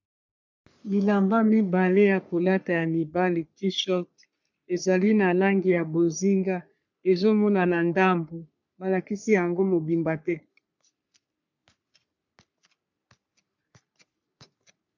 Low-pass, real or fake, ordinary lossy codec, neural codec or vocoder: 7.2 kHz; fake; MP3, 64 kbps; codec, 44.1 kHz, 3.4 kbps, Pupu-Codec